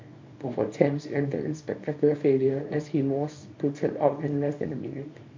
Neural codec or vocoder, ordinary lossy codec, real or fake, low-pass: codec, 24 kHz, 0.9 kbps, WavTokenizer, small release; MP3, 48 kbps; fake; 7.2 kHz